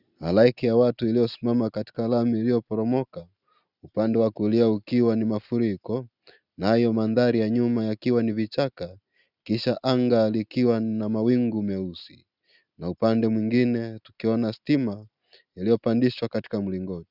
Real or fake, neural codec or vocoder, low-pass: real; none; 5.4 kHz